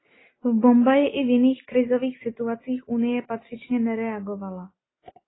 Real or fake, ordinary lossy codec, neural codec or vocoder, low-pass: real; AAC, 16 kbps; none; 7.2 kHz